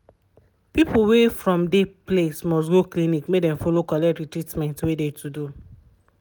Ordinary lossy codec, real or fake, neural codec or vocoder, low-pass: none; real; none; none